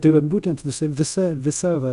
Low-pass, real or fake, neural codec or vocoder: 10.8 kHz; fake; codec, 24 kHz, 0.5 kbps, DualCodec